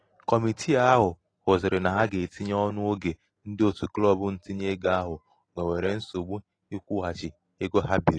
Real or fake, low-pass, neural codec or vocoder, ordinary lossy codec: real; 9.9 kHz; none; AAC, 32 kbps